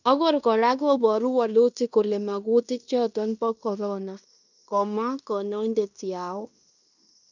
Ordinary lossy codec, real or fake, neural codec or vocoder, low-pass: none; fake; codec, 16 kHz in and 24 kHz out, 0.9 kbps, LongCat-Audio-Codec, fine tuned four codebook decoder; 7.2 kHz